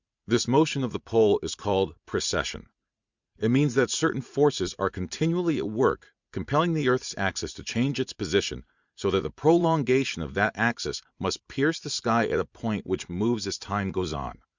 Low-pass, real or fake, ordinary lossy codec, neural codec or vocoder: 7.2 kHz; fake; Opus, 64 kbps; vocoder, 44.1 kHz, 80 mel bands, Vocos